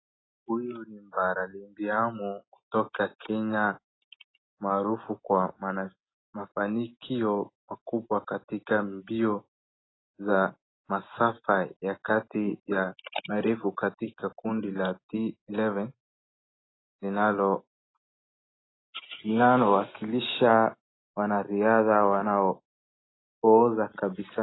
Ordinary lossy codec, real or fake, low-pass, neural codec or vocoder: AAC, 16 kbps; real; 7.2 kHz; none